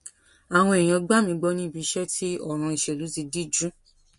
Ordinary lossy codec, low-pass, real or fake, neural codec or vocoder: MP3, 48 kbps; 14.4 kHz; real; none